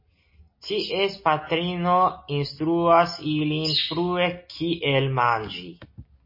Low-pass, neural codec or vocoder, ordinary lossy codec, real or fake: 5.4 kHz; none; MP3, 24 kbps; real